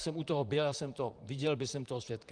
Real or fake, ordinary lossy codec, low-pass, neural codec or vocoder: fake; Opus, 32 kbps; 9.9 kHz; vocoder, 44.1 kHz, 128 mel bands, Pupu-Vocoder